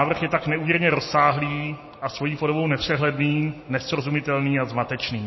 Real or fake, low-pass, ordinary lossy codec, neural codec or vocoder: real; 7.2 kHz; MP3, 24 kbps; none